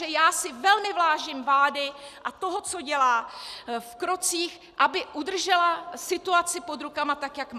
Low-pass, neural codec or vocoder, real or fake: 14.4 kHz; none; real